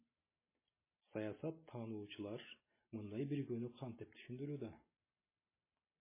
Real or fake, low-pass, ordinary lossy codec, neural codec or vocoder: real; 3.6 kHz; MP3, 16 kbps; none